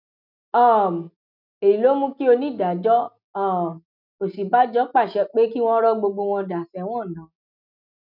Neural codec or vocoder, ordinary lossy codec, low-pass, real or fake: none; none; 5.4 kHz; real